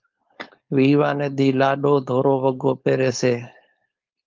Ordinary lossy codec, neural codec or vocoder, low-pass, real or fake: Opus, 24 kbps; codec, 16 kHz, 4.8 kbps, FACodec; 7.2 kHz; fake